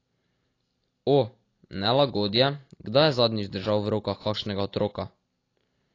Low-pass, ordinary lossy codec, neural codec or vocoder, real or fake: 7.2 kHz; AAC, 32 kbps; none; real